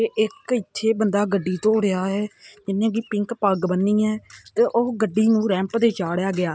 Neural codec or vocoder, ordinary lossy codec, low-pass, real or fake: none; none; none; real